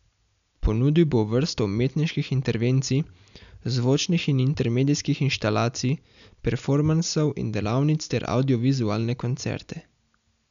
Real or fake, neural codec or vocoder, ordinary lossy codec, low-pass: real; none; MP3, 96 kbps; 7.2 kHz